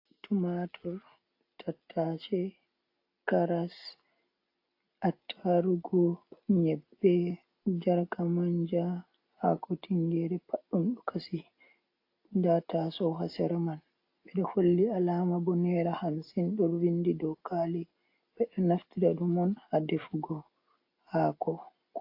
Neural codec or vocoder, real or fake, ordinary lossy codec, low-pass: none; real; AAC, 32 kbps; 5.4 kHz